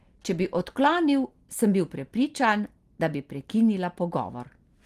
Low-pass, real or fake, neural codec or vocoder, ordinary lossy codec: 14.4 kHz; real; none; Opus, 24 kbps